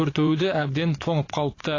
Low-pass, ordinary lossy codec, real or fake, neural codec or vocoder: 7.2 kHz; AAC, 32 kbps; fake; vocoder, 44.1 kHz, 80 mel bands, Vocos